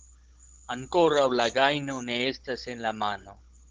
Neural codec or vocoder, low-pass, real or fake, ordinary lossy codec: codec, 16 kHz, 16 kbps, FunCodec, trained on Chinese and English, 50 frames a second; 7.2 kHz; fake; Opus, 16 kbps